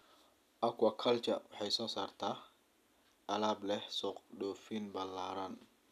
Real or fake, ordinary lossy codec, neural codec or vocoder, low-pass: real; none; none; 14.4 kHz